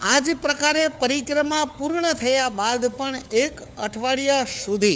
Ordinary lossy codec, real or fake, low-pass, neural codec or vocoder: none; fake; none; codec, 16 kHz, 4 kbps, FunCodec, trained on LibriTTS, 50 frames a second